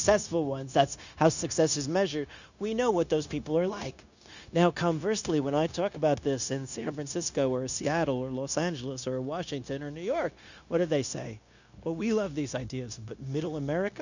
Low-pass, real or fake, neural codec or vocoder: 7.2 kHz; fake; codec, 16 kHz, 0.9 kbps, LongCat-Audio-Codec